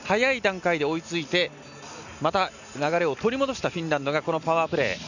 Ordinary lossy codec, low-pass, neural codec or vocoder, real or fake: none; 7.2 kHz; none; real